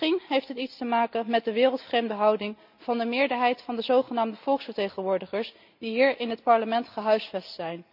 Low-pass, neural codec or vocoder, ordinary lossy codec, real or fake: 5.4 kHz; none; none; real